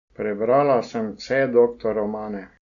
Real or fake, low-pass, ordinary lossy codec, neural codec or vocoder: real; 7.2 kHz; none; none